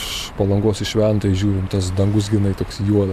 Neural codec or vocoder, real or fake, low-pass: vocoder, 44.1 kHz, 128 mel bands every 512 samples, BigVGAN v2; fake; 14.4 kHz